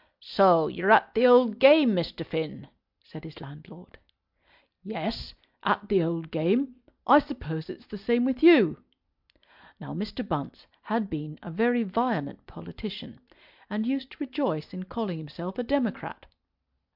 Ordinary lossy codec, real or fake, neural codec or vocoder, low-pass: MP3, 48 kbps; real; none; 5.4 kHz